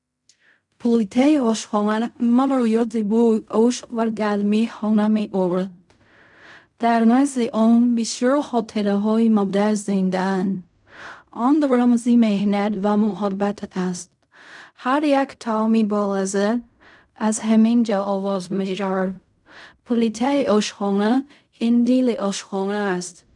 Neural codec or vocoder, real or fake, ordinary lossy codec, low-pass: codec, 16 kHz in and 24 kHz out, 0.4 kbps, LongCat-Audio-Codec, fine tuned four codebook decoder; fake; none; 10.8 kHz